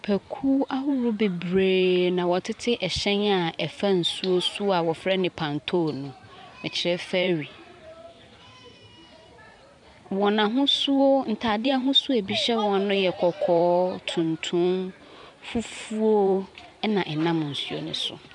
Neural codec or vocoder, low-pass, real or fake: vocoder, 44.1 kHz, 128 mel bands every 512 samples, BigVGAN v2; 10.8 kHz; fake